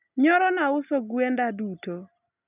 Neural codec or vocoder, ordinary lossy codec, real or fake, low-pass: none; none; real; 3.6 kHz